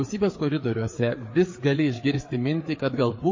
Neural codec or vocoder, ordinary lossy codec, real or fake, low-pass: codec, 16 kHz, 4 kbps, FreqCodec, larger model; MP3, 32 kbps; fake; 7.2 kHz